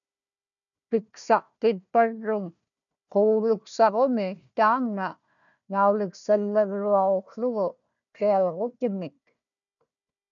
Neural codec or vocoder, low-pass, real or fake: codec, 16 kHz, 1 kbps, FunCodec, trained on Chinese and English, 50 frames a second; 7.2 kHz; fake